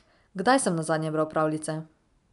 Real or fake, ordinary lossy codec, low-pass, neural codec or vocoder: real; none; 10.8 kHz; none